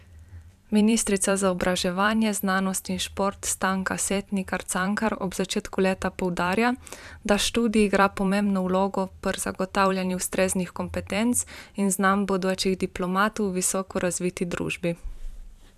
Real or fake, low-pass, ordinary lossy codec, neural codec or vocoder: fake; 14.4 kHz; none; vocoder, 44.1 kHz, 128 mel bands every 512 samples, BigVGAN v2